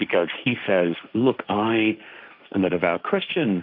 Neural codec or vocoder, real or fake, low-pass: codec, 16 kHz, 1.1 kbps, Voila-Tokenizer; fake; 5.4 kHz